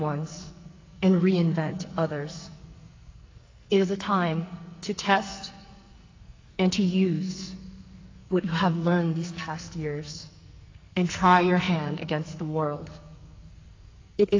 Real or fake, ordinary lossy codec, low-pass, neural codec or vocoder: fake; AAC, 32 kbps; 7.2 kHz; codec, 44.1 kHz, 2.6 kbps, SNAC